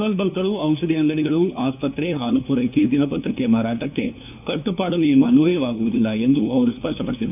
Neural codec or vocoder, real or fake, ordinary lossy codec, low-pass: codec, 16 kHz, 2 kbps, FunCodec, trained on LibriTTS, 25 frames a second; fake; none; 3.6 kHz